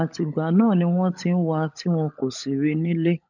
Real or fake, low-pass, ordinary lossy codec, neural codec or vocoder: fake; 7.2 kHz; none; codec, 16 kHz, 16 kbps, FunCodec, trained on LibriTTS, 50 frames a second